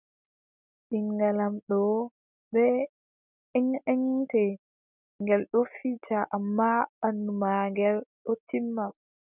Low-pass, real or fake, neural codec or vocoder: 3.6 kHz; real; none